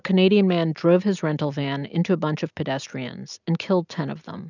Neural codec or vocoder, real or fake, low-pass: none; real; 7.2 kHz